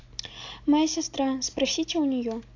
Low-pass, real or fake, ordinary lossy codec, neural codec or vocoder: 7.2 kHz; real; AAC, 48 kbps; none